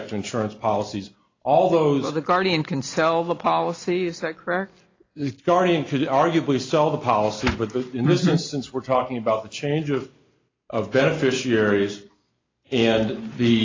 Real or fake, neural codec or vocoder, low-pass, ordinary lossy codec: real; none; 7.2 kHz; AAC, 32 kbps